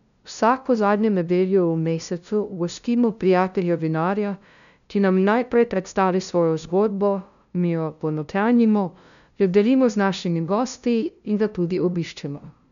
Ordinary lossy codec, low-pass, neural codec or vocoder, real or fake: none; 7.2 kHz; codec, 16 kHz, 0.5 kbps, FunCodec, trained on LibriTTS, 25 frames a second; fake